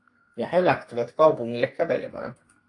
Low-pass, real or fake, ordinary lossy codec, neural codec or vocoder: 10.8 kHz; fake; AAC, 48 kbps; codec, 32 kHz, 1.9 kbps, SNAC